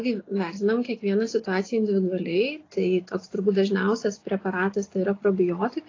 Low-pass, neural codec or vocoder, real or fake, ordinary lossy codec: 7.2 kHz; none; real; AAC, 32 kbps